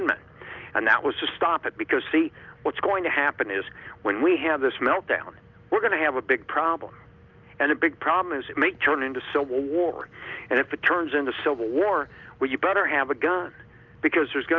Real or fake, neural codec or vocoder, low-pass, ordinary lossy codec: real; none; 7.2 kHz; Opus, 24 kbps